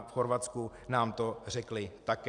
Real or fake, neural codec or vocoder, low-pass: real; none; 10.8 kHz